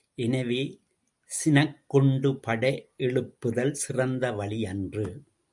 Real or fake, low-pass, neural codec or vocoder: real; 10.8 kHz; none